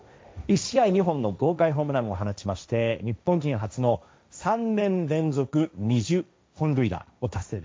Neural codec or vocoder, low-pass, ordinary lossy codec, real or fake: codec, 16 kHz, 1.1 kbps, Voila-Tokenizer; none; none; fake